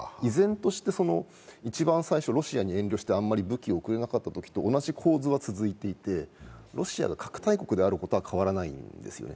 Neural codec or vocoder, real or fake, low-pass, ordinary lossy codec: none; real; none; none